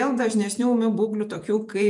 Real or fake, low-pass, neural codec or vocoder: fake; 10.8 kHz; vocoder, 48 kHz, 128 mel bands, Vocos